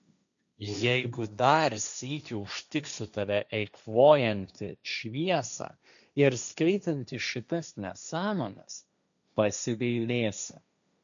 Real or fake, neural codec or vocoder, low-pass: fake; codec, 16 kHz, 1.1 kbps, Voila-Tokenizer; 7.2 kHz